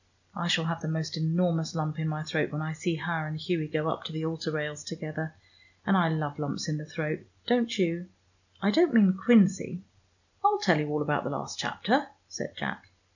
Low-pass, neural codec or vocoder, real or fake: 7.2 kHz; none; real